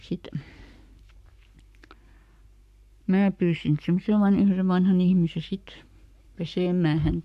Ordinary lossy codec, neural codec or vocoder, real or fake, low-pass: none; codec, 44.1 kHz, 7.8 kbps, Pupu-Codec; fake; 14.4 kHz